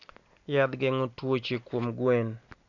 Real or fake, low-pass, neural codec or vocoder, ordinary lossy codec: real; 7.2 kHz; none; none